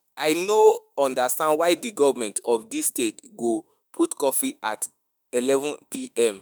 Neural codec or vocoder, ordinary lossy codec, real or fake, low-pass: autoencoder, 48 kHz, 32 numbers a frame, DAC-VAE, trained on Japanese speech; none; fake; none